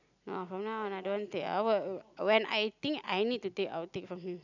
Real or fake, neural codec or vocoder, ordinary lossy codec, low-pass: real; none; none; 7.2 kHz